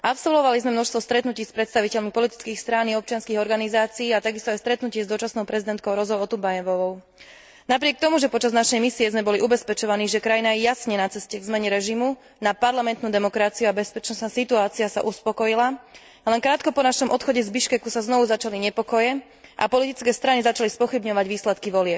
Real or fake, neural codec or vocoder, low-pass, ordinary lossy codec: real; none; none; none